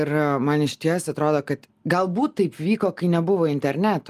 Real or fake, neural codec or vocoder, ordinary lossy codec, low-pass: real; none; Opus, 32 kbps; 14.4 kHz